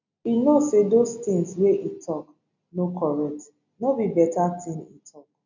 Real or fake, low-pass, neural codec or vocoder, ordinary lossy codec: real; 7.2 kHz; none; none